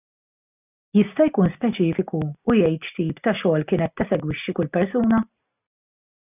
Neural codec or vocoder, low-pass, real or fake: none; 3.6 kHz; real